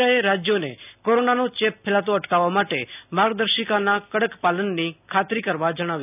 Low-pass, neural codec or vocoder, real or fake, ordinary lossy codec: 3.6 kHz; none; real; none